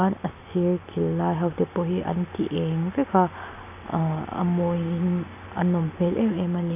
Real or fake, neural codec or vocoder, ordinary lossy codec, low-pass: real; none; AAC, 32 kbps; 3.6 kHz